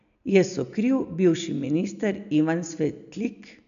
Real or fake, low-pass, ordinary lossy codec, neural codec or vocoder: real; 7.2 kHz; none; none